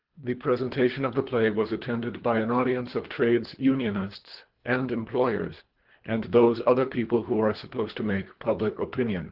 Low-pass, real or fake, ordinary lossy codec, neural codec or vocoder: 5.4 kHz; fake; Opus, 16 kbps; codec, 24 kHz, 3 kbps, HILCodec